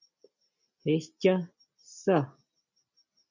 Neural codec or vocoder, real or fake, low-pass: none; real; 7.2 kHz